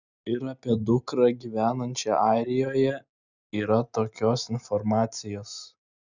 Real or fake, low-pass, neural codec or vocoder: real; 7.2 kHz; none